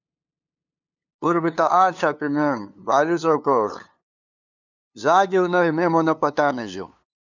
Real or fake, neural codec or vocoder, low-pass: fake; codec, 16 kHz, 2 kbps, FunCodec, trained on LibriTTS, 25 frames a second; 7.2 kHz